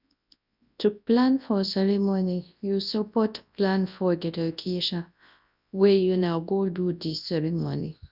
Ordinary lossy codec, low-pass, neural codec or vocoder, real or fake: none; 5.4 kHz; codec, 24 kHz, 0.9 kbps, WavTokenizer, large speech release; fake